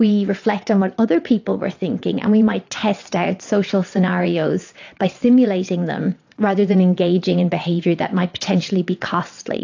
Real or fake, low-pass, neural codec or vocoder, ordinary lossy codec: fake; 7.2 kHz; vocoder, 22.05 kHz, 80 mel bands, WaveNeXt; AAC, 48 kbps